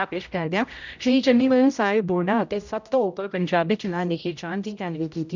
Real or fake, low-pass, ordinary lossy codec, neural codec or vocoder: fake; 7.2 kHz; none; codec, 16 kHz, 0.5 kbps, X-Codec, HuBERT features, trained on general audio